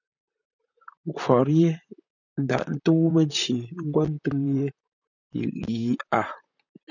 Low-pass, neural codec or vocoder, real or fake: 7.2 kHz; vocoder, 44.1 kHz, 128 mel bands, Pupu-Vocoder; fake